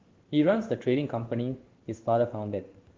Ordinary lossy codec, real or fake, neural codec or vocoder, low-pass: Opus, 16 kbps; fake; codec, 16 kHz in and 24 kHz out, 1 kbps, XY-Tokenizer; 7.2 kHz